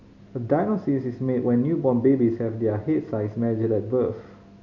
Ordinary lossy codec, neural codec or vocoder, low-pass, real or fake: none; none; 7.2 kHz; real